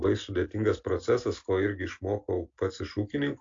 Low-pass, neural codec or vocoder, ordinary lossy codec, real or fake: 7.2 kHz; none; AAC, 48 kbps; real